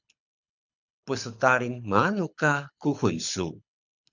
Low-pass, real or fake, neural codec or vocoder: 7.2 kHz; fake; codec, 24 kHz, 6 kbps, HILCodec